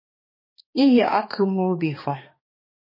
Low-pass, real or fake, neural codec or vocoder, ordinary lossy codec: 5.4 kHz; fake; codec, 16 kHz, 2 kbps, X-Codec, HuBERT features, trained on balanced general audio; MP3, 24 kbps